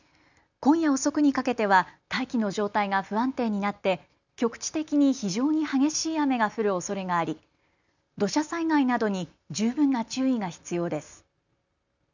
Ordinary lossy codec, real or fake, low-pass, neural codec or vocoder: none; real; 7.2 kHz; none